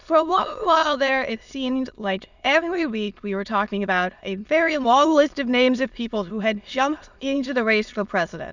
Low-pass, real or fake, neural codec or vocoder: 7.2 kHz; fake; autoencoder, 22.05 kHz, a latent of 192 numbers a frame, VITS, trained on many speakers